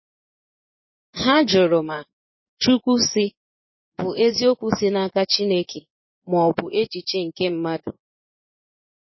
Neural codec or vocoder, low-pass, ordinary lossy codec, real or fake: vocoder, 24 kHz, 100 mel bands, Vocos; 7.2 kHz; MP3, 24 kbps; fake